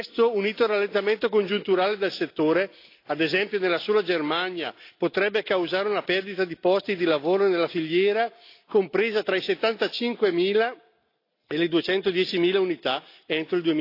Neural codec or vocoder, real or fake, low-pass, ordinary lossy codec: none; real; 5.4 kHz; AAC, 32 kbps